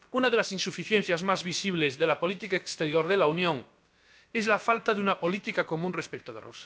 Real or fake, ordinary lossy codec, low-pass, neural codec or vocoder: fake; none; none; codec, 16 kHz, about 1 kbps, DyCAST, with the encoder's durations